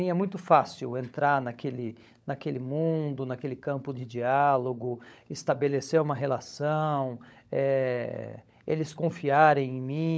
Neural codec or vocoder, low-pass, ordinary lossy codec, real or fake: codec, 16 kHz, 16 kbps, FunCodec, trained on LibriTTS, 50 frames a second; none; none; fake